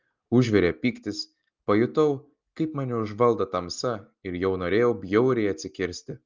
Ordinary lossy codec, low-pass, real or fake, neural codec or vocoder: Opus, 24 kbps; 7.2 kHz; real; none